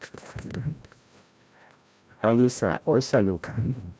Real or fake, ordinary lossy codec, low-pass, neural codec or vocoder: fake; none; none; codec, 16 kHz, 0.5 kbps, FreqCodec, larger model